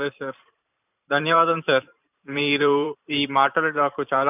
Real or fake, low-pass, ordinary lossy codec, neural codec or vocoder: fake; 3.6 kHz; none; vocoder, 44.1 kHz, 128 mel bands every 512 samples, BigVGAN v2